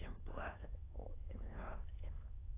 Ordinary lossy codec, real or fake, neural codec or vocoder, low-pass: AAC, 16 kbps; fake; autoencoder, 22.05 kHz, a latent of 192 numbers a frame, VITS, trained on many speakers; 3.6 kHz